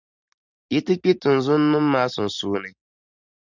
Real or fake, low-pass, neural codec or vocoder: real; 7.2 kHz; none